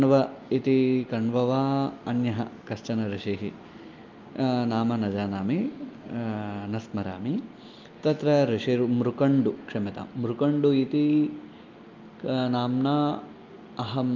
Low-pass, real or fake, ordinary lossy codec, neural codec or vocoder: 7.2 kHz; real; Opus, 24 kbps; none